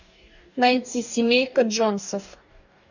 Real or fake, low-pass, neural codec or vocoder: fake; 7.2 kHz; codec, 44.1 kHz, 2.6 kbps, DAC